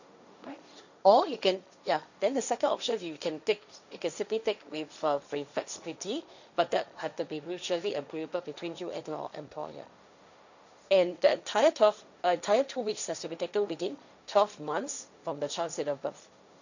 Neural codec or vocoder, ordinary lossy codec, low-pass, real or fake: codec, 16 kHz, 1.1 kbps, Voila-Tokenizer; none; 7.2 kHz; fake